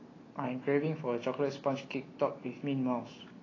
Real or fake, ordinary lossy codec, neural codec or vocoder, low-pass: real; AAC, 32 kbps; none; 7.2 kHz